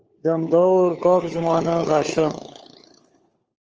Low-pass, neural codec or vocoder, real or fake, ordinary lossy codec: 7.2 kHz; codec, 16 kHz, 4 kbps, FunCodec, trained on LibriTTS, 50 frames a second; fake; Opus, 24 kbps